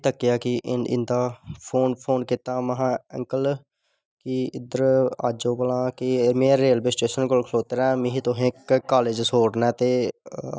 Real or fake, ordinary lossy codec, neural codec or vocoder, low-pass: real; none; none; none